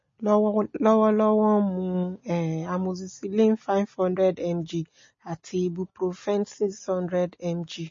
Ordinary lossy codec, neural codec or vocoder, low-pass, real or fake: MP3, 32 kbps; none; 7.2 kHz; real